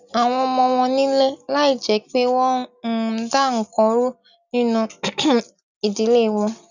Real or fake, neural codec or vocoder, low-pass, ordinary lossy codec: real; none; 7.2 kHz; none